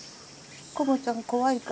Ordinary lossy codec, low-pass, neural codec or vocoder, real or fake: none; none; none; real